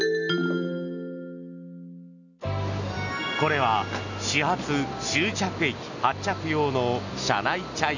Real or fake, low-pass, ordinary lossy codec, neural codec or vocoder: real; 7.2 kHz; none; none